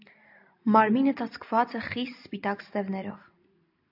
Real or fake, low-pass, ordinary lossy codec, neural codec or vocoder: real; 5.4 kHz; AAC, 48 kbps; none